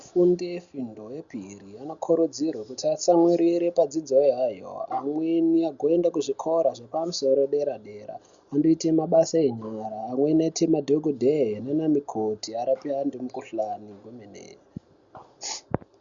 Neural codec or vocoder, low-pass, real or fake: none; 7.2 kHz; real